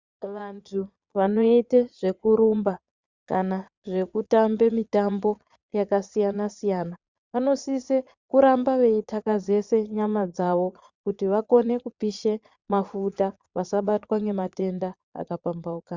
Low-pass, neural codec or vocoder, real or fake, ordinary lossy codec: 7.2 kHz; vocoder, 22.05 kHz, 80 mel bands, Vocos; fake; Opus, 64 kbps